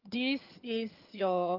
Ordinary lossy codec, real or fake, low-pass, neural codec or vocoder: Opus, 24 kbps; fake; 5.4 kHz; vocoder, 22.05 kHz, 80 mel bands, HiFi-GAN